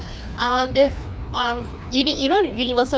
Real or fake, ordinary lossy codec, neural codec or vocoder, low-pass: fake; none; codec, 16 kHz, 2 kbps, FreqCodec, larger model; none